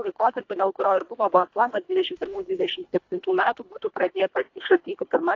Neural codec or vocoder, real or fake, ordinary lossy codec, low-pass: codec, 24 kHz, 1.5 kbps, HILCodec; fake; AAC, 48 kbps; 7.2 kHz